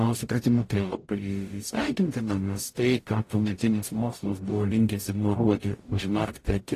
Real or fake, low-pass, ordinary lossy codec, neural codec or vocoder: fake; 14.4 kHz; AAC, 48 kbps; codec, 44.1 kHz, 0.9 kbps, DAC